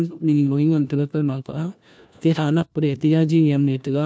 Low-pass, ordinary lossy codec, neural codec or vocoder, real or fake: none; none; codec, 16 kHz, 1 kbps, FunCodec, trained on LibriTTS, 50 frames a second; fake